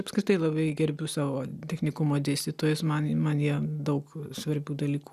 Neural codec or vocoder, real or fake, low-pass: none; real; 14.4 kHz